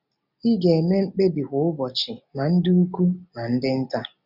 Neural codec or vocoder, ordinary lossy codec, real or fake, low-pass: none; none; real; 5.4 kHz